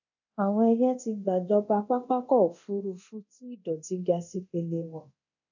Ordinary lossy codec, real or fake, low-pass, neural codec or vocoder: none; fake; 7.2 kHz; codec, 24 kHz, 0.9 kbps, DualCodec